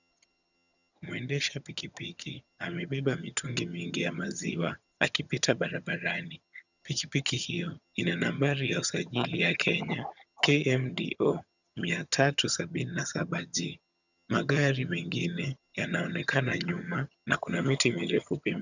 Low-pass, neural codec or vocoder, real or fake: 7.2 kHz; vocoder, 22.05 kHz, 80 mel bands, HiFi-GAN; fake